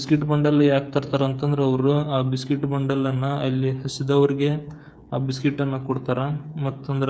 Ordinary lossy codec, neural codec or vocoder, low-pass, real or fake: none; codec, 16 kHz, 8 kbps, FreqCodec, smaller model; none; fake